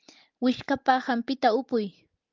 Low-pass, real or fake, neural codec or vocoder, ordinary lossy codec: 7.2 kHz; real; none; Opus, 32 kbps